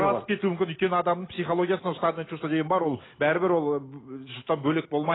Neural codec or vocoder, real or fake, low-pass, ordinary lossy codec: none; real; 7.2 kHz; AAC, 16 kbps